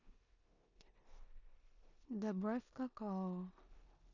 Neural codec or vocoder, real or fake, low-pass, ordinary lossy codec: codec, 16 kHz in and 24 kHz out, 0.9 kbps, LongCat-Audio-Codec, four codebook decoder; fake; 7.2 kHz; none